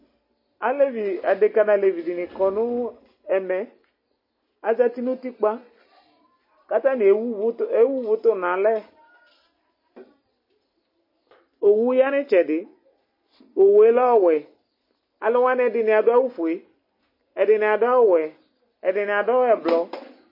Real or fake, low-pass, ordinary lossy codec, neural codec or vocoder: real; 5.4 kHz; MP3, 24 kbps; none